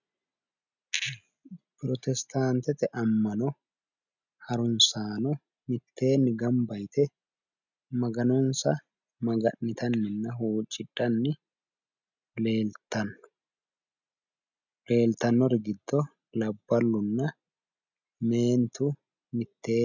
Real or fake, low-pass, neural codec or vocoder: real; 7.2 kHz; none